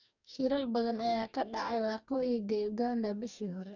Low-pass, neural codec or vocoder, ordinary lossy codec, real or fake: 7.2 kHz; codec, 44.1 kHz, 2.6 kbps, DAC; none; fake